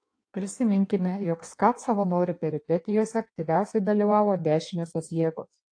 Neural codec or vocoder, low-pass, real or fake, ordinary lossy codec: codec, 16 kHz in and 24 kHz out, 1.1 kbps, FireRedTTS-2 codec; 9.9 kHz; fake; AAC, 48 kbps